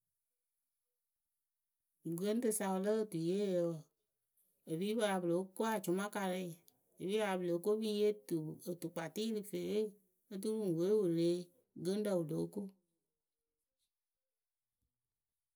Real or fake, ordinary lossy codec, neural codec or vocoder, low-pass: real; none; none; none